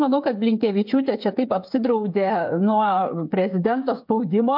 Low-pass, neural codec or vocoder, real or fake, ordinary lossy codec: 5.4 kHz; codec, 16 kHz, 8 kbps, FreqCodec, smaller model; fake; MP3, 48 kbps